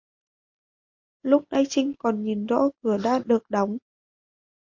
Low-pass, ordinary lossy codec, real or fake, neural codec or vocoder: 7.2 kHz; MP3, 48 kbps; real; none